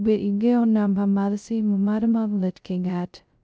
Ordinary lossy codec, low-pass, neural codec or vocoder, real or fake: none; none; codec, 16 kHz, 0.2 kbps, FocalCodec; fake